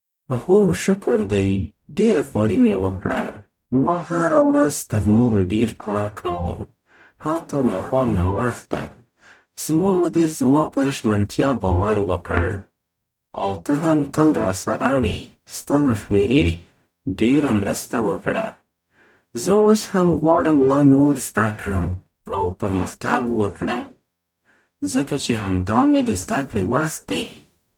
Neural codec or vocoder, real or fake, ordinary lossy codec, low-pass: codec, 44.1 kHz, 0.9 kbps, DAC; fake; none; 19.8 kHz